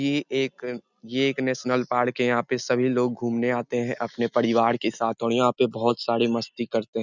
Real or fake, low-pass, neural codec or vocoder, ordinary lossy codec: real; 7.2 kHz; none; none